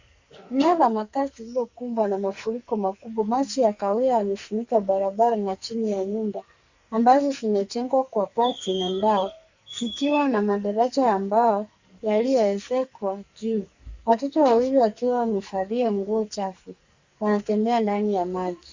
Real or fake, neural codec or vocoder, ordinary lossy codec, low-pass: fake; codec, 44.1 kHz, 2.6 kbps, SNAC; Opus, 64 kbps; 7.2 kHz